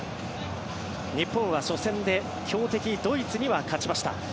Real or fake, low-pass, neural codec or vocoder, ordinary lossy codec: real; none; none; none